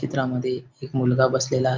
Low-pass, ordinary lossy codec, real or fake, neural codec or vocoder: 7.2 kHz; Opus, 24 kbps; real; none